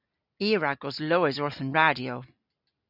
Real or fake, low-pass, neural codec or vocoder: real; 5.4 kHz; none